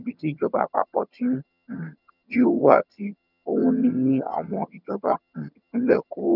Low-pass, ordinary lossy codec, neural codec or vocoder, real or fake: 5.4 kHz; none; vocoder, 22.05 kHz, 80 mel bands, HiFi-GAN; fake